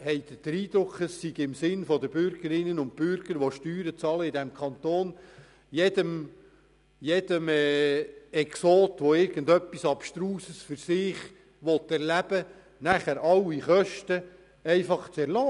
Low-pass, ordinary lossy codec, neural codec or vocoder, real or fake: 10.8 kHz; none; none; real